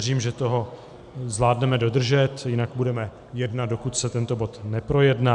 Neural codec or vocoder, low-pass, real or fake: none; 10.8 kHz; real